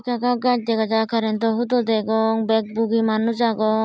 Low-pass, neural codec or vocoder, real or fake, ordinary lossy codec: none; none; real; none